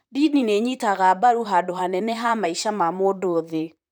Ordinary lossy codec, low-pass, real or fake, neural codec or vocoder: none; none; fake; vocoder, 44.1 kHz, 128 mel bands, Pupu-Vocoder